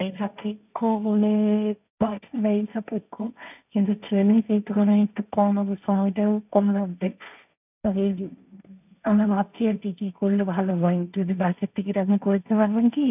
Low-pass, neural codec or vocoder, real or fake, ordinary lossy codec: 3.6 kHz; codec, 16 kHz, 1.1 kbps, Voila-Tokenizer; fake; none